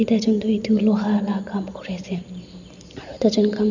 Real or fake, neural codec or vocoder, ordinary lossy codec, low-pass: real; none; none; 7.2 kHz